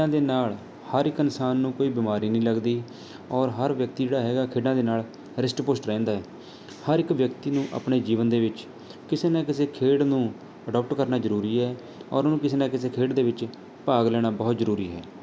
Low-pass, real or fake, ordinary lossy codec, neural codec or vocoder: none; real; none; none